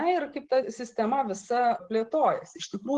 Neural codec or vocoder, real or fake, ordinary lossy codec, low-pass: none; real; Opus, 16 kbps; 10.8 kHz